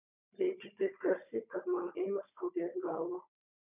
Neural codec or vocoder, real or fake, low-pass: codec, 16 kHz, 16 kbps, FunCodec, trained on Chinese and English, 50 frames a second; fake; 3.6 kHz